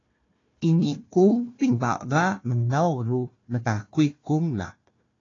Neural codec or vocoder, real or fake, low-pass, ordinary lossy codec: codec, 16 kHz, 1 kbps, FunCodec, trained on Chinese and English, 50 frames a second; fake; 7.2 kHz; AAC, 32 kbps